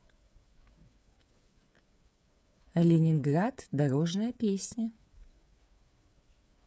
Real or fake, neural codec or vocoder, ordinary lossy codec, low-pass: fake; codec, 16 kHz, 8 kbps, FreqCodec, smaller model; none; none